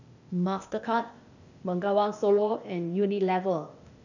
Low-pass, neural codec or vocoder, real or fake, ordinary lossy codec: 7.2 kHz; codec, 16 kHz, 0.8 kbps, ZipCodec; fake; none